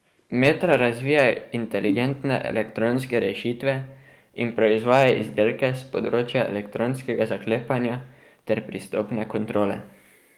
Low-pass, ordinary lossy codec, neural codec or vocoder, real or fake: 19.8 kHz; Opus, 32 kbps; codec, 44.1 kHz, 7.8 kbps, DAC; fake